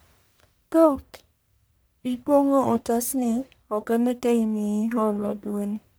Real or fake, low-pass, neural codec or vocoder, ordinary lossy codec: fake; none; codec, 44.1 kHz, 1.7 kbps, Pupu-Codec; none